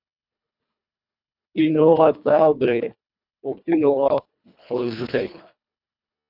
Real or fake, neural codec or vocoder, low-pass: fake; codec, 24 kHz, 1.5 kbps, HILCodec; 5.4 kHz